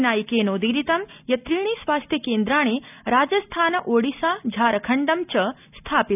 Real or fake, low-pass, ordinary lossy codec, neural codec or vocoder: real; 3.6 kHz; none; none